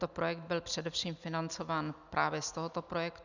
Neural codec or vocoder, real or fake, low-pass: none; real; 7.2 kHz